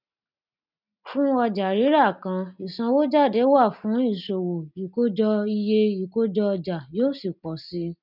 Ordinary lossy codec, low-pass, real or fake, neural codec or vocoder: none; 5.4 kHz; real; none